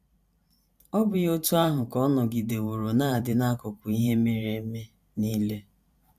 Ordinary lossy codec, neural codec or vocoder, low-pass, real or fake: AAC, 96 kbps; vocoder, 44.1 kHz, 128 mel bands every 512 samples, BigVGAN v2; 14.4 kHz; fake